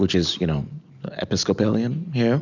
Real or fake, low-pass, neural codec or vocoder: real; 7.2 kHz; none